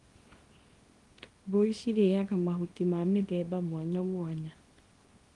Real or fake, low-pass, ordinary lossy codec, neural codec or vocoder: fake; 10.8 kHz; Opus, 24 kbps; codec, 24 kHz, 0.9 kbps, WavTokenizer, small release